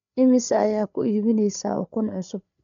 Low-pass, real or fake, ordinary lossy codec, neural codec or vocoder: 7.2 kHz; fake; none; codec, 16 kHz, 8 kbps, FreqCodec, larger model